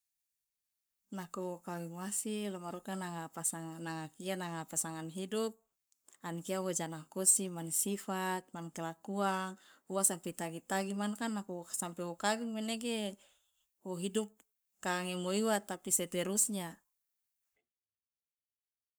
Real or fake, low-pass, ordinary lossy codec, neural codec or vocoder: fake; none; none; codec, 44.1 kHz, 7.8 kbps, Pupu-Codec